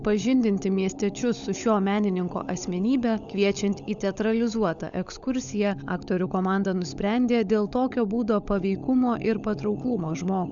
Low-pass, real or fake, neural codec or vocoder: 7.2 kHz; fake; codec, 16 kHz, 16 kbps, FunCodec, trained on LibriTTS, 50 frames a second